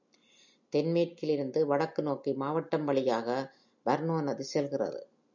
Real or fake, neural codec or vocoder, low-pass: real; none; 7.2 kHz